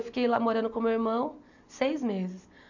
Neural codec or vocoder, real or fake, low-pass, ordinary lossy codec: none; real; 7.2 kHz; none